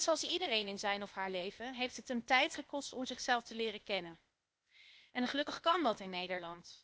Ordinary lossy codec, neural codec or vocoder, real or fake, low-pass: none; codec, 16 kHz, 0.8 kbps, ZipCodec; fake; none